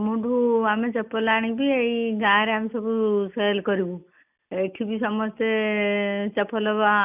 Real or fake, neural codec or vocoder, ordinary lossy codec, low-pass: real; none; none; 3.6 kHz